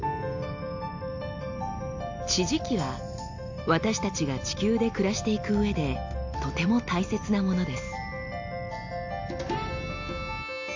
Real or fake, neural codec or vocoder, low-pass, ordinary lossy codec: real; none; 7.2 kHz; AAC, 48 kbps